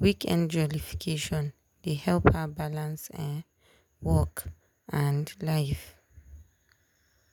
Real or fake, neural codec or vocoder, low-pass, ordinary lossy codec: real; none; none; none